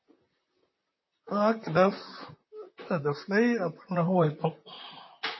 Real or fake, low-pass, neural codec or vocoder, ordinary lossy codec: fake; 7.2 kHz; vocoder, 44.1 kHz, 128 mel bands, Pupu-Vocoder; MP3, 24 kbps